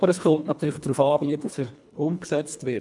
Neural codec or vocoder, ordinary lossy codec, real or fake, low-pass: codec, 24 kHz, 1.5 kbps, HILCodec; MP3, 96 kbps; fake; 10.8 kHz